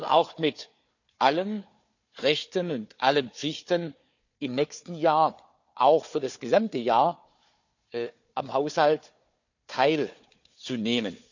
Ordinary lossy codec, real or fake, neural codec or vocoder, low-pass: none; fake; codec, 16 kHz, 4 kbps, FunCodec, trained on Chinese and English, 50 frames a second; 7.2 kHz